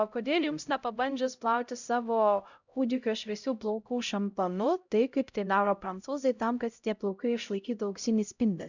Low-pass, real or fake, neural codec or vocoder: 7.2 kHz; fake; codec, 16 kHz, 0.5 kbps, X-Codec, HuBERT features, trained on LibriSpeech